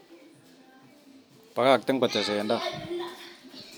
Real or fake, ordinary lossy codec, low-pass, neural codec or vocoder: real; none; none; none